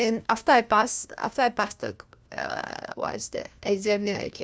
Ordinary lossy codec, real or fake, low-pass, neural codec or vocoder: none; fake; none; codec, 16 kHz, 1 kbps, FunCodec, trained on LibriTTS, 50 frames a second